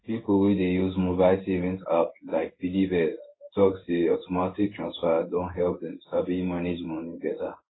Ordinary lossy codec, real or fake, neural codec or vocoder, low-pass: AAC, 16 kbps; fake; codec, 16 kHz in and 24 kHz out, 1 kbps, XY-Tokenizer; 7.2 kHz